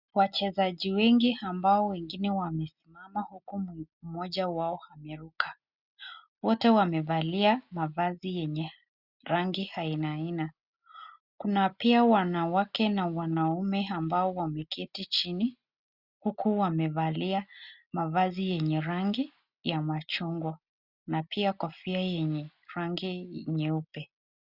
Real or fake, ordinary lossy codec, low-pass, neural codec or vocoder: real; Opus, 64 kbps; 5.4 kHz; none